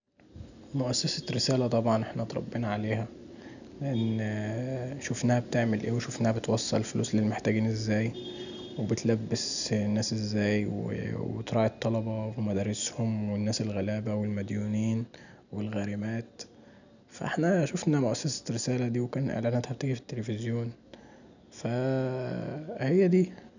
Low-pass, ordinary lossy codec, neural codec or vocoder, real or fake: 7.2 kHz; none; none; real